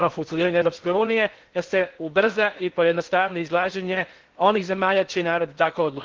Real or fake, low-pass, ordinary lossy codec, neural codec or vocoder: fake; 7.2 kHz; Opus, 16 kbps; codec, 16 kHz in and 24 kHz out, 0.8 kbps, FocalCodec, streaming, 65536 codes